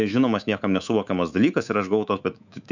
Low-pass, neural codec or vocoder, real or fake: 7.2 kHz; codec, 24 kHz, 3.1 kbps, DualCodec; fake